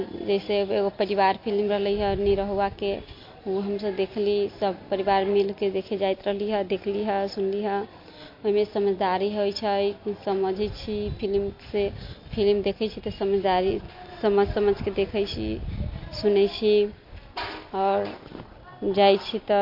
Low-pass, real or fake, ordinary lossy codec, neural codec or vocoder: 5.4 kHz; real; MP3, 32 kbps; none